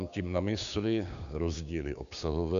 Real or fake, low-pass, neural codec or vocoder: fake; 7.2 kHz; codec, 16 kHz, 6 kbps, DAC